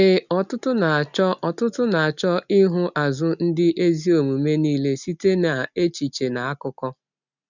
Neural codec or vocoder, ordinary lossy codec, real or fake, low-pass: none; none; real; 7.2 kHz